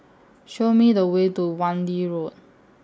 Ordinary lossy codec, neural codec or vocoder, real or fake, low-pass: none; none; real; none